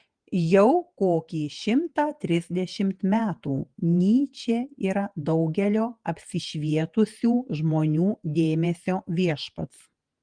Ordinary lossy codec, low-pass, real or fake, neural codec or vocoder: Opus, 24 kbps; 9.9 kHz; fake; vocoder, 48 kHz, 128 mel bands, Vocos